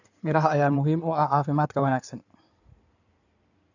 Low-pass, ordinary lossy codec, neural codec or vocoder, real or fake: 7.2 kHz; none; codec, 16 kHz in and 24 kHz out, 2.2 kbps, FireRedTTS-2 codec; fake